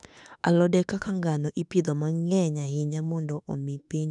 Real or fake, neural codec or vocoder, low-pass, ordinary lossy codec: fake; autoencoder, 48 kHz, 32 numbers a frame, DAC-VAE, trained on Japanese speech; 10.8 kHz; none